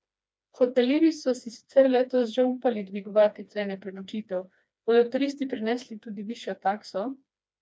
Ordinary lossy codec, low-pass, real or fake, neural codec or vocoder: none; none; fake; codec, 16 kHz, 2 kbps, FreqCodec, smaller model